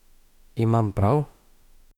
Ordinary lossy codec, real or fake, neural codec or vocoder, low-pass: none; fake; autoencoder, 48 kHz, 32 numbers a frame, DAC-VAE, trained on Japanese speech; 19.8 kHz